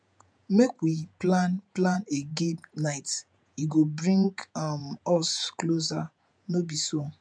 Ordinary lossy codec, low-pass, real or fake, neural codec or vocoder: none; 9.9 kHz; fake; vocoder, 48 kHz, 128 mel bands, Vocos